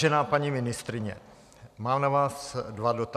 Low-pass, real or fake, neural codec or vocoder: 14.4 kHz; real; none